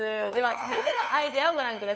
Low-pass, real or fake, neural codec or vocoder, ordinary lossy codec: none; fake; codec, 16 kHz, 2 kbps, FunCodec, trained on LibriTTS, 25 frames a second; none